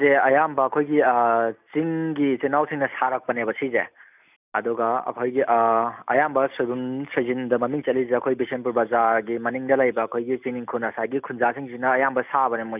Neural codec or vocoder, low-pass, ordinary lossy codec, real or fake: none; 3.6 kHz; none; real